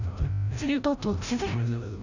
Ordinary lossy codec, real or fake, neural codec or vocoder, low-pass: none; fake; codec, 16 kHz, 0.5 kbps, FreqCodec, larger model; 7.2 kHz